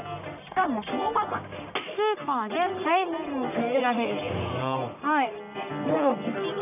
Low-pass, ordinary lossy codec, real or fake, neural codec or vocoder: 3.6 kHz; none; fake; codec, 44.1 kHz, 1.7 kbps, Pupu-Codec